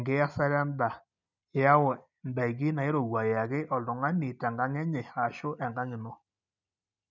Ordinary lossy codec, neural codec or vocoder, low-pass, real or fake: none; none; 7.2 kHz; real